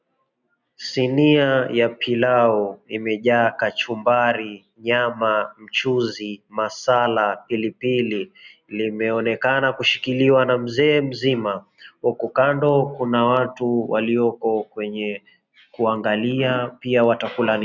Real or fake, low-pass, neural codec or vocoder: real; 7.2 kHz; none